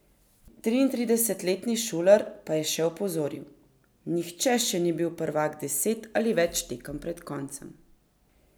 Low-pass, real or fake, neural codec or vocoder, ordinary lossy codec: none; real; none; none